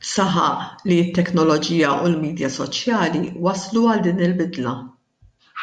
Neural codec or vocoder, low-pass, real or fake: none; 10.8 kHz; real